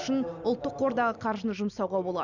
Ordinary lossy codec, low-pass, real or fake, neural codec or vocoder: none; 7.2 kHz; real; none